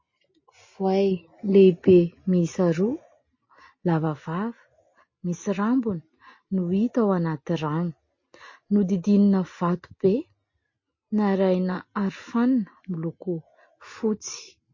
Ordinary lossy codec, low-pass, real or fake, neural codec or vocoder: MP3, 32 kbps; 7.2 kHz; real; none